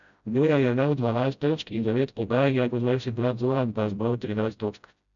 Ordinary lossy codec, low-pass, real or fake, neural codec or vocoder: none; 7.2 kHz; fake; codec, 16 kHz, 0.5 kbps, FreqCodec, smaller model